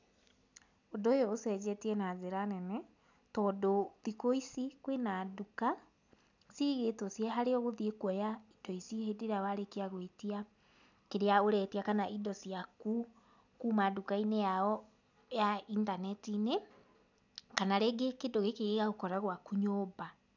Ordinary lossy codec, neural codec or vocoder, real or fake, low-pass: none; none; real; 7.2 kHz